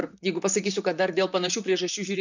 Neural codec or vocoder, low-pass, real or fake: vocoder, 44.1 kHz, 128 mel bands, Pupu-Vocoder; 7.2 kHz; fake